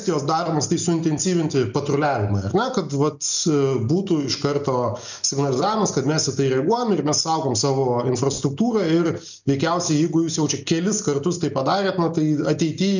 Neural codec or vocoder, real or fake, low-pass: none; real; 7.2 kHz